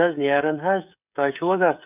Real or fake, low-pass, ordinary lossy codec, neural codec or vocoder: fake; 3.6 kHz; none; codec, 16 kHz, 16 kbps, FreqCodec, smaller model